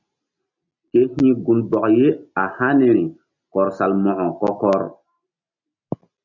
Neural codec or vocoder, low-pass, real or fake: none; 7.2 kHz; real